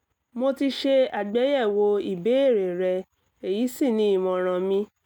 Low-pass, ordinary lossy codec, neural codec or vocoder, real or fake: 19.8 kHz; none; none; real